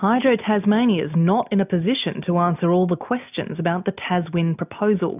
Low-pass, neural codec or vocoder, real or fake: 3.6 kHz; none; real